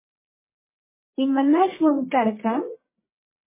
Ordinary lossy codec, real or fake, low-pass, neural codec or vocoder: MP3, 16 kbps; fake; 3.6 kHz; codec, 44.1 kHz, 1.7 kbps, Pupu-Codec